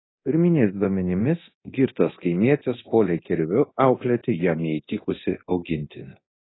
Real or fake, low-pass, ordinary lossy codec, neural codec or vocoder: fake; 7.2 kHz; AAC, 16 kbps; codec, 24 kHz, 0.9 kbps, DualCodec